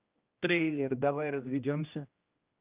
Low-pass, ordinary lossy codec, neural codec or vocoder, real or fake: 3.6 kHz; Opus, 24 kbps; codec, 16 kHz, 1 kbps, X-Codec, HuBERT features, trained on general audio; fake